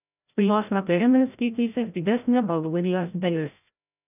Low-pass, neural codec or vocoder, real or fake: 3.6 kHz; codec, 16 kHz, 0.5 kbps, FreqCodec, larger model; fake